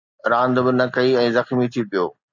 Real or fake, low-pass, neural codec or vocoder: real; 7.2 kHz; none